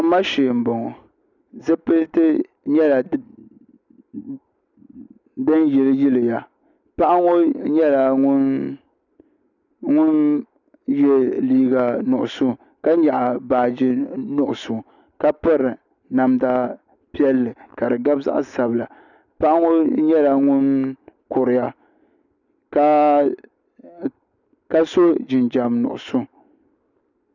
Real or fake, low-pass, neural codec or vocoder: real; 7.2 kHz; none